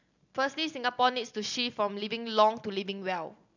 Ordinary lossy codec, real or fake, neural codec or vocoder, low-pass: none; real; none; 7.2 kHz